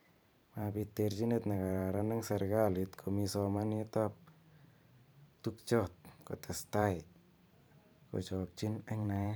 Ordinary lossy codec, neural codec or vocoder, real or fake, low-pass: none; vocoder, 44.1 kHz, 128 mel bands every 512 samples, BigVGAN v2; fake; none